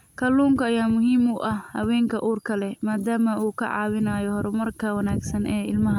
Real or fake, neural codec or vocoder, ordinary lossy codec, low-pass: real; none; none; 19.8 kHz